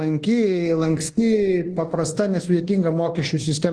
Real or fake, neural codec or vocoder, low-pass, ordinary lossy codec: fake; codec, 24 kHz, 1.2 kbps, DualCodec; 10.8 kHz; Opus, 16 kbps